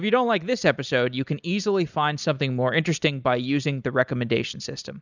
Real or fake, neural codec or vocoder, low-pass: real; none; 7.2 kHz